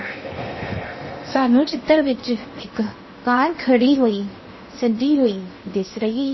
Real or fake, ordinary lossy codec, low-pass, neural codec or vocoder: fake; MP3, 24 kbps; 7.2 kHz; codec, 16 kHz in and 24 kHz out, 0.8 kbps, FocalCodec, streaming, 65536 codes